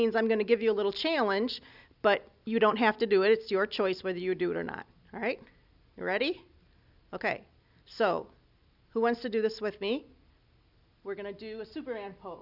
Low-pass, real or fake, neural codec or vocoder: 5.4 kHz; real; none